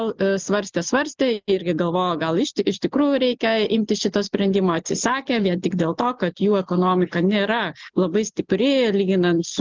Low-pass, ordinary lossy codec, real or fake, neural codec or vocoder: 7.2 kHz; Opus, 24 kbps; real; none